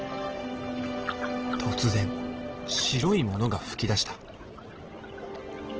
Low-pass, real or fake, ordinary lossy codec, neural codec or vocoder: 7.2 kHz; real; Opus, 16 kbps; none